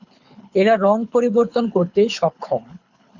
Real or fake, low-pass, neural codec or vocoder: fake; 7.2 kHz; codec, 24 kHz, 6 kbps, HILCodec